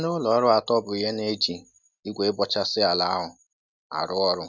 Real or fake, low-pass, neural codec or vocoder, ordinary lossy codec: real; 7.2 kHz; none; none